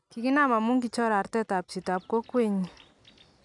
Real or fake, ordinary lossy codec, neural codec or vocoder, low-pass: real; none; none; 10.8 kHz